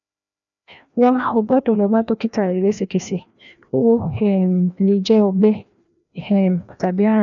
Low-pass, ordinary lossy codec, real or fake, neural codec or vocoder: 7.2 kHz; none; fake; codec, 16 kHz, 1 kbps, FreqCodec, larger model